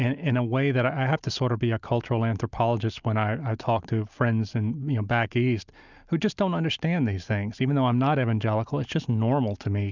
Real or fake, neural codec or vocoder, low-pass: real; none; 7.2 kHz